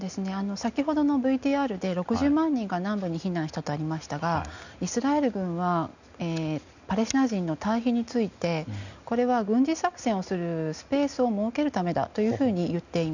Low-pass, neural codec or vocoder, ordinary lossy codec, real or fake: 7.2 kHz; none; none; real